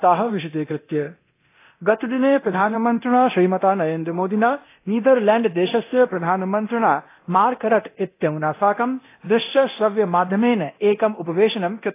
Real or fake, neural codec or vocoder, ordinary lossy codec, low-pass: fake; codec, 24 kHz, 0.9 kbps, DualCodec; AAC, 24 kbps; 3.6 kHz